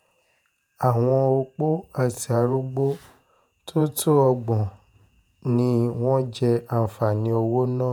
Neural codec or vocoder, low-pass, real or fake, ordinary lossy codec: vocoder, 48 kHz, 128 mel bands, Vocos; none; fake; none